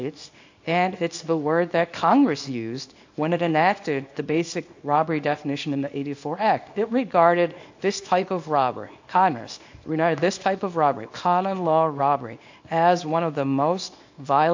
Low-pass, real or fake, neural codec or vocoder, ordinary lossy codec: 7.2 kHz; fake; codec, 24 kHz, 0.9 kbps, WavTokenizer, small release; AAC, 48 kbps